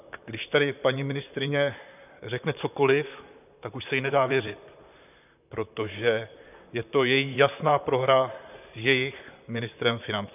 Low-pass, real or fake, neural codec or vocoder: 3.6 kHz; fake; vocoder, 44.1 kHz, 128 mel bands, Pupu-Vocoder